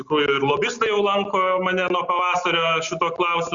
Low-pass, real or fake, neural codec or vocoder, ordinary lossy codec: 7.2 kHz; real; none; Opus, 64 kbps